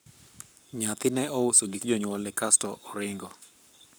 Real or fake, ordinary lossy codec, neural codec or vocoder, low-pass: fake; none; codec, 44.1 kHz, 7.8 kbps, Pupu-Codec; none